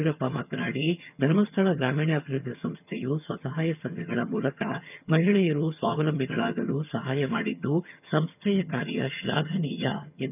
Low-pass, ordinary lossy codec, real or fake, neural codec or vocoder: 3.6 kHz; none; fake; vocoder, 22.05 kHz, 80 mel bands, HiFi-GAN